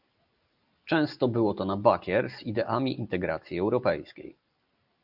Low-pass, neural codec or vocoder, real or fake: 5.4 kHz; vocoder, 44.1 kHz, 128 mel bands every 256 samples, BigVGAN v2; fake